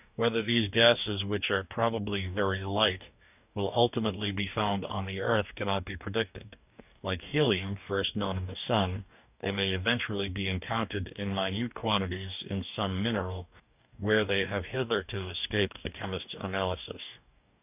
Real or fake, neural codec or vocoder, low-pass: fake; codec, 44.1 kHz, 2.6 kbps, DAC; 3.6 kHz